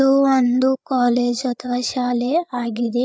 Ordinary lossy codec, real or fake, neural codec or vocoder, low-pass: none; fake; codec, 16 kHz, 8 kbps, FreqCodec, larger model; none